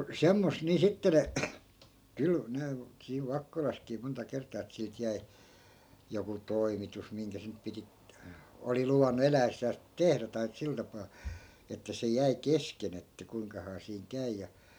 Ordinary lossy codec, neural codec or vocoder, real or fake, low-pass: none; none; real; none